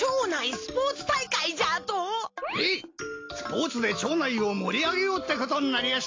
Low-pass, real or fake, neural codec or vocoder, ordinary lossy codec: 7.2 kHz; real; none; AAC, 32 kbps